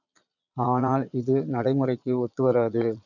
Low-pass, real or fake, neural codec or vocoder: 7.2 kHz; fake; vocoder, 22.05 kHz, 80 mel bands, Vocos